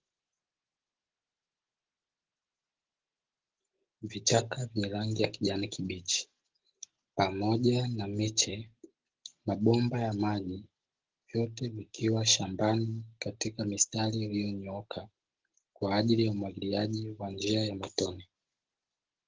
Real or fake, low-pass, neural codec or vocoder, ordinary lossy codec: real; 7.2 kHz; none; Opus, 16 kbps